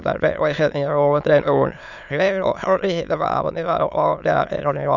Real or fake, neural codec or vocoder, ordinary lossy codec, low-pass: fake; autoencoder, 22.05 kHz, a latent of 192 numbers a frame, VITS, trained on many speakers; none; 7.2 kHz